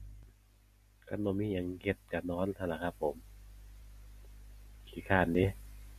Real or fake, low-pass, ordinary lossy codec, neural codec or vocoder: real; 14.4 kHz; MP3, 64 kbps; none